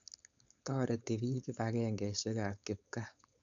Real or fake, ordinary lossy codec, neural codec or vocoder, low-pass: fake; none; codec, 16 kHz, 4.8 kbps, FACodec; 7.2 kHz